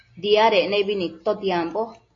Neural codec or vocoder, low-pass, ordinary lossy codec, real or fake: none; 7.2 kHz; AAC, 32 kbps; real